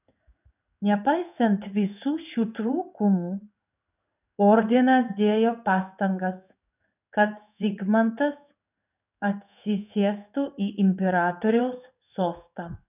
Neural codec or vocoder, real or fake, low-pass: codec, 16 kHz in and 24 kHz out, 1 kbps, XY-Tokenizer; fake; 3.6 kHz